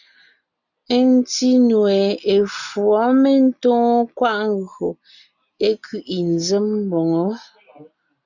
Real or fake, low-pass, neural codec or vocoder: real; 7.2 kHz; none